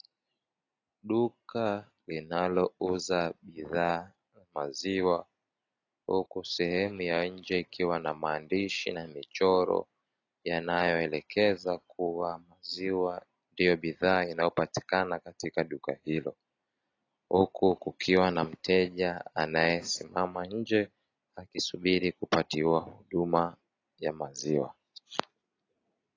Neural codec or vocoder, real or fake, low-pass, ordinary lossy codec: none; real; 7.2 kHz; AAC, 32 kbps